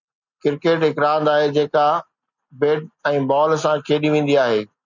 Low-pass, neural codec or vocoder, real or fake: 7.2 kHz; none; real